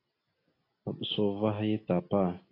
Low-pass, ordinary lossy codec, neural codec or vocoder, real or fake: 5.4 kHz; AAC, 24 kbps; none; real